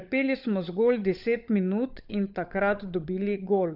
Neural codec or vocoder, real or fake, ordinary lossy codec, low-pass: codec, 16 kHz, 16 kbps, FunCodec, trained on LibriTTS, 50 frames a second; fake; none; 5.4 kHz